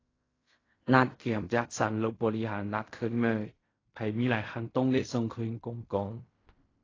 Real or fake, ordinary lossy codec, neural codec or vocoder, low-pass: fake; AAC, 32 kbps; codec, 16 kHz in and 24 kHz out, 0.4 kbps, LongCat-Audio-Codec, fine tuned four codebook decoder; 7.2 kHz